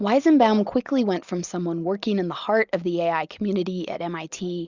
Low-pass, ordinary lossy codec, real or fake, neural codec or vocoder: 7.2 kHz; Opus, 64 kbps; real; none